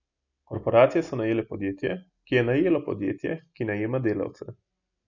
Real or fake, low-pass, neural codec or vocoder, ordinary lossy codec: real; 7.2 kHz; none; none